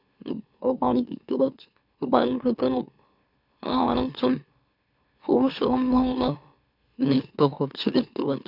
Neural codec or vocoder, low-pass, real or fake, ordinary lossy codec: autoencoder, 44.1 kHz, a latent of 192 numbers a frame, MeloTTS; 5.4 kHz; fake; none